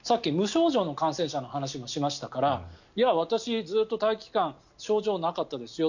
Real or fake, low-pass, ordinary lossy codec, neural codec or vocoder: real; 7.2 kHz; none; none